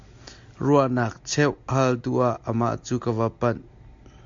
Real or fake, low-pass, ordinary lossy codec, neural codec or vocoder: real; 7.2 kHz; MP3, 48 kbps; none